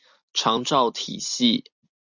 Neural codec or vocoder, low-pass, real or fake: none; 7.2 kHz; real